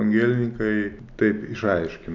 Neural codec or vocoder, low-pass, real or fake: none; 7.2 kHz; real